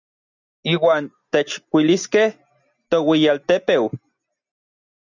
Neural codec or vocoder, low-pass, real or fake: none; 7.2 kHz; real